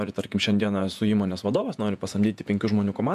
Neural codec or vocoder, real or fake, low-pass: vocoder, 48 kHz, 128 mel bands, Vocos; fake; 14.4 kHz